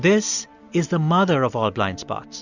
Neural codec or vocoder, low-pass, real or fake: none; 7.2 kHz; real